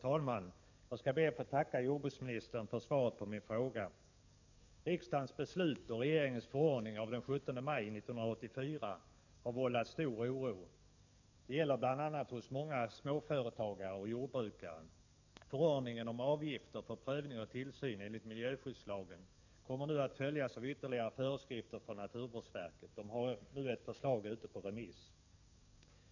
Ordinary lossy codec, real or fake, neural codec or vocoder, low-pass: none; fake; codec, 44.1 kHz, 7.8 kbps, DAC; 7.2 kHz